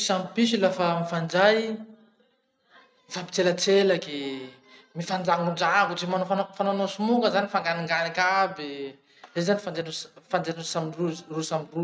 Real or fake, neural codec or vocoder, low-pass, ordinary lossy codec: real; none; none; none